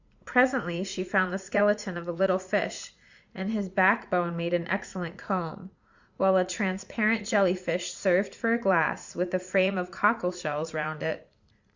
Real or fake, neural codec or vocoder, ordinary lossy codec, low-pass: fake; vocoder, 44.1 kHz, 80 mel bands, Vocos; Opus, 64 kbps; 7.2 kHz